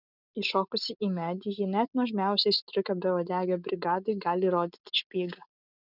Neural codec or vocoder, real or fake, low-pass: none; real; 5.4 kHz